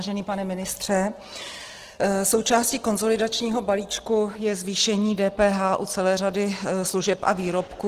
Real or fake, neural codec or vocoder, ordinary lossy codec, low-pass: real; none; Opus, 16 kbps; 14.4 kHz